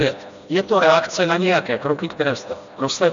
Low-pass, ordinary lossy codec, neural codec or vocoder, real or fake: 7.2 kHz; MP3, 64 kbps; codec, 16 kHz, 1 kbps, FreqCodec, smaller model; fake